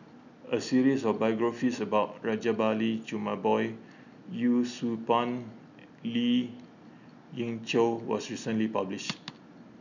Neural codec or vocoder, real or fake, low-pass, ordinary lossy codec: none; real; 7.2 kHz; none